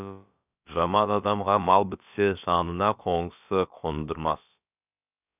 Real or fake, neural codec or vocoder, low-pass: fake; codec, 16 kHz, about 1 kbps, DyCAST, with the encoder's durations; 3.6 kHz